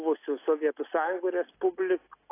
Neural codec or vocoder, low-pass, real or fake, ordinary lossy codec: none; 3.6 kHz; real; AAC, 24 kbps